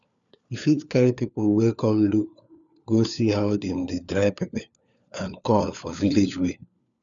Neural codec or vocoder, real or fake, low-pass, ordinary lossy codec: codec, 16 kHz, 4 kbps, FunCodec, trained on LibriTTS, 50 frames a second; fake; 7.2 kHz; none